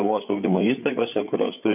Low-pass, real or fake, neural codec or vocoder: 3.6 kHz; fake; codec, 16 kHz, 8 kbps, FreqCodec, larger model